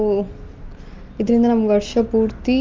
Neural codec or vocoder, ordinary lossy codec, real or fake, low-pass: none; Opus, 24 kbps; real; 7.2 kHz